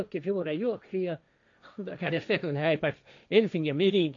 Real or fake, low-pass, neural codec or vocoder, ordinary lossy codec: fake; 7.2 kHz; codec, 16 kHz, 1.1 kbps, Voila-Tokenizer; AAC, 64 kbps